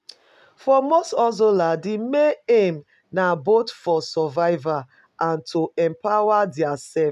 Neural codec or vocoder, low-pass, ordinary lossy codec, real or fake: none; 14.4 kHz; none; real